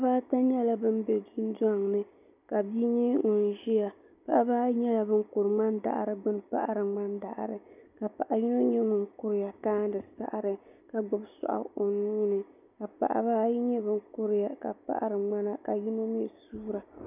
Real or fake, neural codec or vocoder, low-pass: real; none; 3.6 kHz